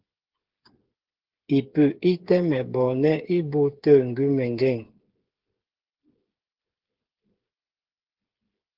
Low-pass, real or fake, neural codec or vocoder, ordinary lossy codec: 5.4 kHz; fake; codec, 16 kHz, 8 kbps, FreqCodec, smaller model; Opus, 16 kbps